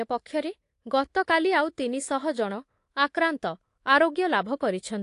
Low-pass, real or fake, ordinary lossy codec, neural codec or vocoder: 10.8 kHz; fake; AAC, 48 kbps; codec, 24 kHz, 3.1 kbps, DualCodec